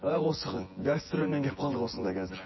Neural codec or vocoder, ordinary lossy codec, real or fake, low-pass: vocoder, 24 kHz, 100 mel bands, Vocos; MP3, 24 kbps; fake; 7.2 kHz